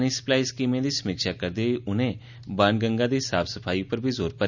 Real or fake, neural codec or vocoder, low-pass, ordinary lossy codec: real; none; 7.2 kHz; none